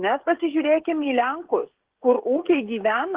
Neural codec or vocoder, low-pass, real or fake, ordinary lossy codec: codec, 16 kHz, 16 kbps, FreqCodec, smaller model; 3.6 kHz; fake; Opus, 16 kbps